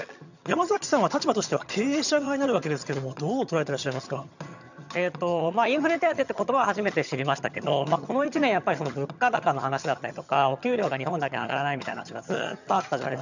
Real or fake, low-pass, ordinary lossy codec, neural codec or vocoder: fake; 7.2 kHz; none; vocoder, 22.05 kHz, 80 mel bands, HiFi-GAN